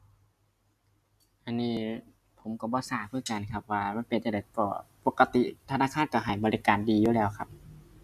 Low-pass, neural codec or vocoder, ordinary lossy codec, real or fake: 14.4 kHz; none; none; real